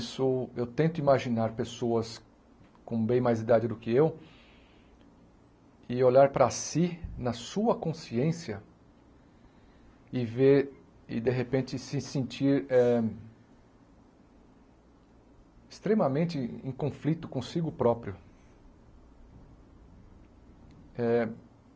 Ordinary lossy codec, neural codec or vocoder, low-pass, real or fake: none; none; none; real